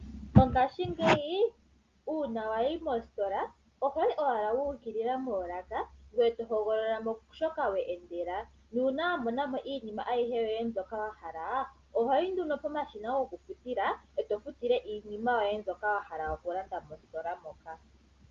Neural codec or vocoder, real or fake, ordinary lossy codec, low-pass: none; real; Opus, 32 kbps; 7.2 kHz